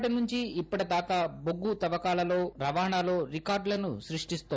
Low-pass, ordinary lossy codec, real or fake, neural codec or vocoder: none; none; real; none